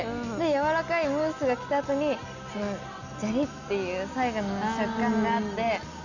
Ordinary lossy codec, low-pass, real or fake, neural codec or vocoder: none; 7.2 kHz; real; none